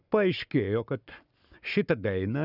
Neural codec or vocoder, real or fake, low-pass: codec, 44.1 kHz, 7.8 kbps, Pupu-Codec; fake; 5.4 kHz